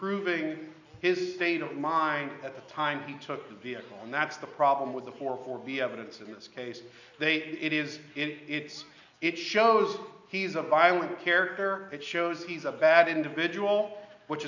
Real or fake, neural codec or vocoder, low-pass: real; none; 7.2 kHz